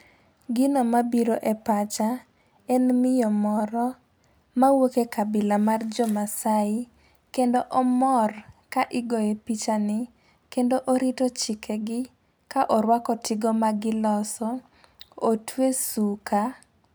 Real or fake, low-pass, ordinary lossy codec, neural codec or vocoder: real; none; none; none